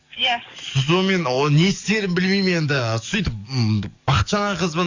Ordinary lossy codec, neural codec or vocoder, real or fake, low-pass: AAC, 48 kbps; codec, 44.1 kHz, 7.8 kbps, DAC; fake; 7.2 kHz